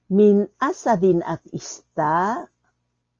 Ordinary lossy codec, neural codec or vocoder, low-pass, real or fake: Opus, 32 kbps; none; 7.2 kHz; real